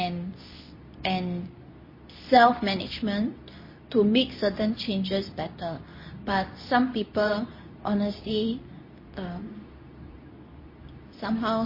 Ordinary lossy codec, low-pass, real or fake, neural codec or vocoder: MP3, 24 kbps; 5.4 kHz; fake; codec, 24 kHz, 0.9 kbps, WavTokenizer, medium speech release version 2